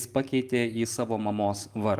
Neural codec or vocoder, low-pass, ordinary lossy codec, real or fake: none; 14.4 kHz; Opus, 32 kbps; real